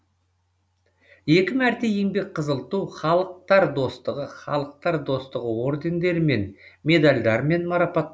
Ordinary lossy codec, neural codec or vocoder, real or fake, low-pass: none; none; real; none